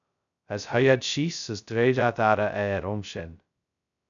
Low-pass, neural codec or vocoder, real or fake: 7.2 kHz; codec, 16 kHz, 0.2 kbps, FocalCodec; fake